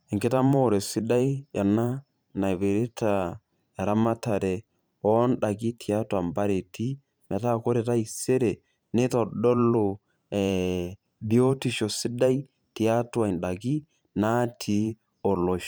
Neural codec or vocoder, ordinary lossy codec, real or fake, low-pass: vocoder, 44.1 kHz, 128 mel bands every 512 samples, BigVGAN v2; none; fake; none